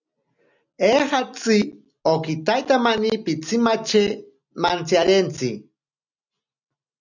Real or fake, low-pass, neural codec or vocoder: real; 7.2 kHz; none